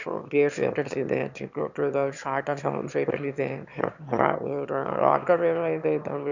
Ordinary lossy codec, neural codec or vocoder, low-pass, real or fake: none; autoencoder, 22.05 kHz, a latent of 192 numbers a frame, VITS, trained on one speaker; 7.2 kHz; fake